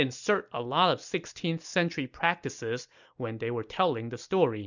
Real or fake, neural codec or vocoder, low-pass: real; none; 7.2 kHz